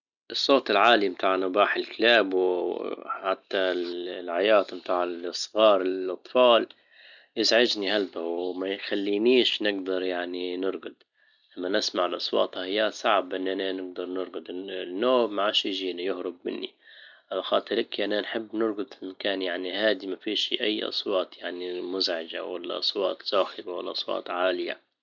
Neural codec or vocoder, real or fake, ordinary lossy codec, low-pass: none; real; none; 7.2 kHz